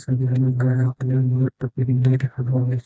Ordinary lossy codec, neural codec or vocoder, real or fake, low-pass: none; codec, 16 kHz, 1 kbps, FreqCodec, smaller model; fake; none